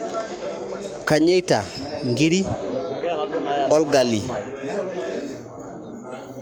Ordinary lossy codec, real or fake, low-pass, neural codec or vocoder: none; fake; none; codec, 44.1 kHz, 7.8 kbps, Pupu-Codec